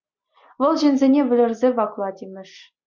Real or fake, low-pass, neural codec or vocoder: real; 7.2 kHz; none